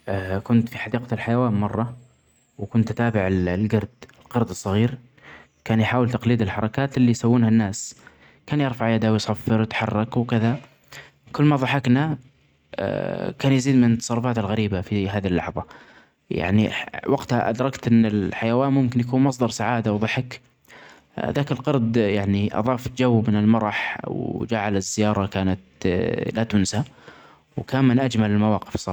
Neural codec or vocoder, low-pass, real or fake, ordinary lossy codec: none; 19.8 kHz; real; none